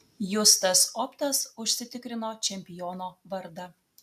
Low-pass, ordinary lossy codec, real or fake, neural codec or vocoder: 14.4 kHz; AAC, 96 kbps; real; none